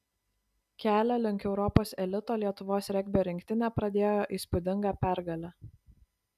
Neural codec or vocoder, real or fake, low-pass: none; real; 14.4 kHz